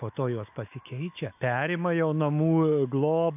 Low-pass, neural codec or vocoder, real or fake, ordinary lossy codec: 3.6 kHz; autoencoder, 48 kHz, 128 numbers a frame, DAC-VAE, trained on Japanese speech; fake; AAC, 32 kbps